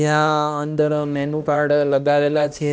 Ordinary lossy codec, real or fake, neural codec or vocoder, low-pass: none; fake; codec, 16 kHz, 1 kbps, X-Codec, HuBERT features, trained on balanced general audio; none